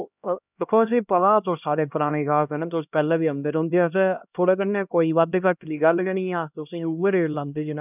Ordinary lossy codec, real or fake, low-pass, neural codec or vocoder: none; fake; 3.6 kHz; codec, 16 kHz, 1 kbps, X-Codec, HuBERT features, trained on LibriSpeech